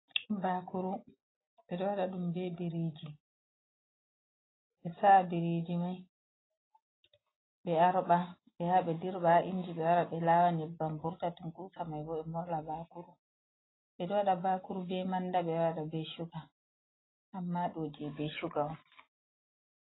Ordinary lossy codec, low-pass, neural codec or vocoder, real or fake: AAC, 16 kbps; 7.2 kHz; none; real